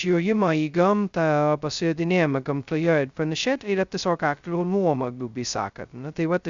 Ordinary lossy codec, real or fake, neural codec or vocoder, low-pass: Opus, 64 kbps; fake; codec, 16 kHz, 0.2 kbps, FocalCodec; 7.2 kHz